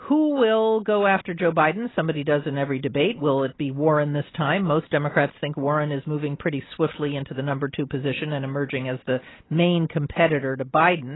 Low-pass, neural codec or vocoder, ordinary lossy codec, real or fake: 7.2 kHz; none; AAC, 16 kbps; real